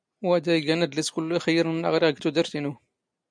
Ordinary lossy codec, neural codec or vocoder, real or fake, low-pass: MP3, 96 kbps; none; real; 9.9 kHz